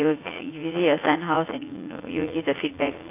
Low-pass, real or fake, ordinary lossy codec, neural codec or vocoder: 3.6 kHz; fake; AAC, 24 kbps; vocoder, 44.1 kHz, 80 mel bands, Vocos